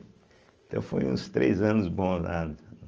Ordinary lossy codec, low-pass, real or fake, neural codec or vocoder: Opus, 24 kbps; 7.2 kHz; real; none